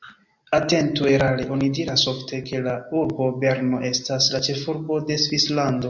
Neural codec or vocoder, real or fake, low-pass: none; real; 7.2 kHz